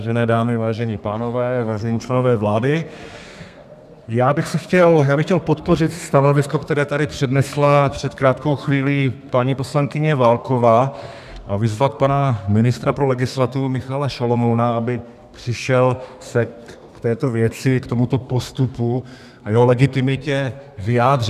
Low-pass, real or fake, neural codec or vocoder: 14.4 kHz; fake; codec, 32 kHz, 1.9 kbps, SNAC